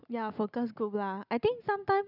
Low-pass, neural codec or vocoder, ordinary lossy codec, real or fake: 5.4 kHz; none; none; real